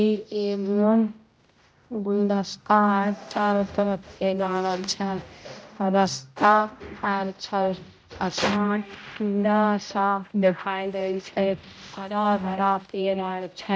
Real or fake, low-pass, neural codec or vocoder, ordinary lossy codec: fake; none; codec, 16 kHz, 0.5 kbps, X-Codec, HuBERT features, trained on general audio; none